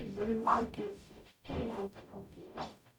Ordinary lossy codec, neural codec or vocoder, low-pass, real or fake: none; codec, 44.1 kHz, 0.9 kbps, DAC; 19.8 kHz; fake